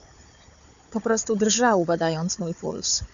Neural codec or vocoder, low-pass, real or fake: codec, 16 kHz, 4 kbps, FunCodec, trained on Chinese and English, 50 frames a second; 7.2 kHz; fake